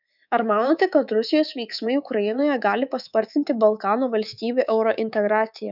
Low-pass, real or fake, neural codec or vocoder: 5.4 kHz; fake; codec, 24 kHz, 3.1 kbps, DualCodec